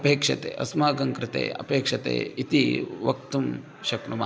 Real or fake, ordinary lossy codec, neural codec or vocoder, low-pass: real; none; none; none